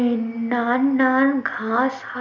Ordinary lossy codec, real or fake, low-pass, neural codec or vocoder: none; real; 7.2 kHz; none